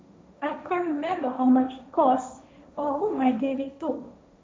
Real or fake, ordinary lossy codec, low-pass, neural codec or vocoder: fake; none; none; codec, 16 kHz, 1.1 kbps, Voila-Tokenizer